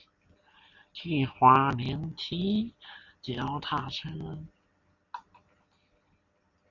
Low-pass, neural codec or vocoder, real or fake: 7.2 kHz; none; real